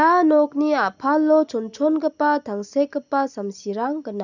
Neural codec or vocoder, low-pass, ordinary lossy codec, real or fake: none; 7.2 kHz; none; real